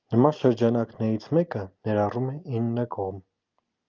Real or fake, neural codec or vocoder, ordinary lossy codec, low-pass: real; none; Opus, 32 kbps; 7.2 kHz